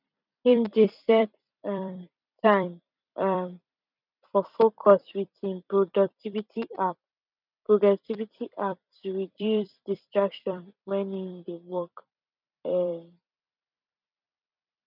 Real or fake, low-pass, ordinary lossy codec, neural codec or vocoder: fake; 5.4 kHz; none; vocoder, 44.1 kHz, 128 mel bands every 256 samples, BigVGAN v2